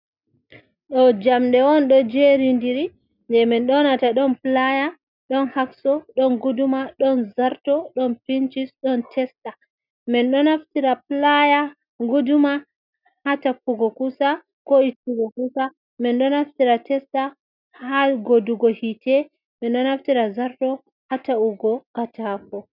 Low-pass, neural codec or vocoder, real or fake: 5.4 kHz; none; real